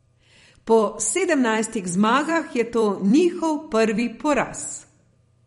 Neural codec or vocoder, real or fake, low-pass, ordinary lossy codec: vocoder, 44.1 kHz, 128 mel bands every 256 samples, BigVGAN v2; fake; 19.8 kHz; MP3, 48 kbps